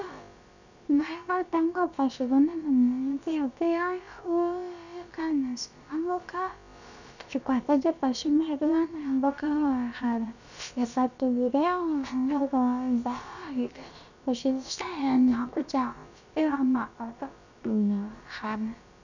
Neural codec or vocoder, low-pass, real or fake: codec, 16 kHz, about 1 kbps, DyCAST, with the encoder's durations; 7.2 kHz; fake